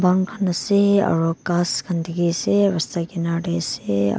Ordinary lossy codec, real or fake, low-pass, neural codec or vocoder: none; real; none; none